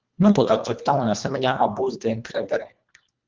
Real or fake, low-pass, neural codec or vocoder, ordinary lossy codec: fake; 7.2 kHz; codec, 24 kHz, 1.5 kbps, HILCodec; Opus, 32 kbps